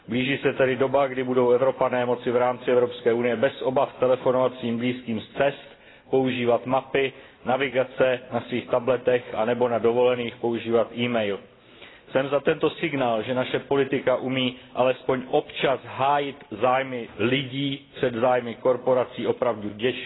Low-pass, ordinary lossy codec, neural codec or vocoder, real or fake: 7.2 kHz; AAC, 16 kbps; none; real